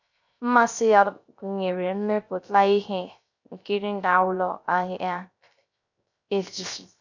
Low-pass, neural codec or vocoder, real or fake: 7.2 kHz; codec, 16 kHz, 0.3 kbps, FocalCodec; fake